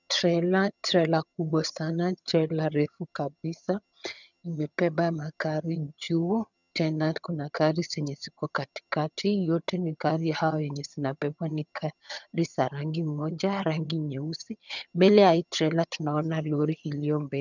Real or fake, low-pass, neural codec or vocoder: fake; 7.2 kHz; vocoder, 22.05 kHz, 80 mel bands, HiFi-GAN